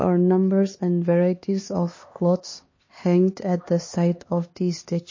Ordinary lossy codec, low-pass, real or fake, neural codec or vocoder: MP3, 32 kbps; 7.2 kHz; fake; codec, 16 kHz, 2 kbps, X-Codec, HuBERT features, trained on LibriSpeech